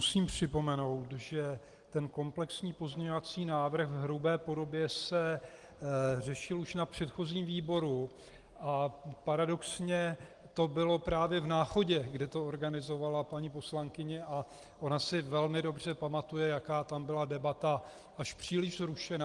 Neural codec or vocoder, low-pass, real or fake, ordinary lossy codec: none; 10.8 kHz; real; Opus, 24 kbps